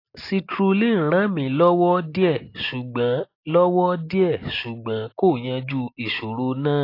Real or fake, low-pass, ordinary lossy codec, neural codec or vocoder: real; 5.4 kHz; AAC, 32 kbps; none